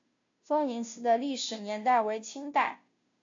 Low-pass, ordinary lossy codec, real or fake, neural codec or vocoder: 7.2 kHz; AAC, 48 kbps; fake; codec, 16 kHz, 0.5 kbps, FunCodec, trained on Chinese and English, 25 frames a second